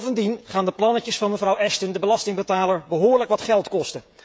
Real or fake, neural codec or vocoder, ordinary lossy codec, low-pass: fake; codec, 16 kHz, 16 kbps, FreqCodec, smaller model; none; none